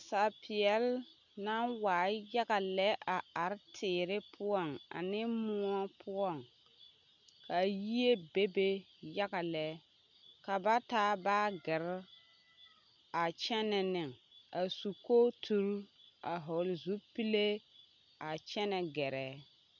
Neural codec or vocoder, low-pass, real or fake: none; 7.2 kHz; real